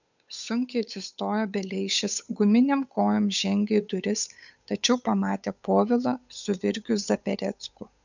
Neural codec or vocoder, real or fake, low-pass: codec, 16 kHz, 8 kbps, FunCodec, trained on Chinese and English, 25 frames a second; fake; 7.2 kHz